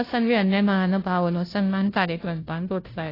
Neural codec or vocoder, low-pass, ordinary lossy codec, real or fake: codec, 16 kHz, 0.5 kbps, FunCodec, trained on Chinese and English, 25 frames a second; 5.4 kHz; AAC, 24 kbps; fake